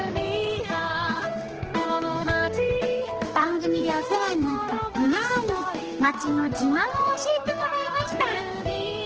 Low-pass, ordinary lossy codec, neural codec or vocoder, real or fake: 7.2 kHz; Opus, 16 kbps; codec, 16 kHz, 2 kbps, X-Codec, HuBERT features, trained on general audio; fake